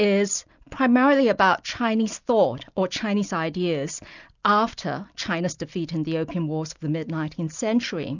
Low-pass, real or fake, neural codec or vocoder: 7.2 kHz; real; none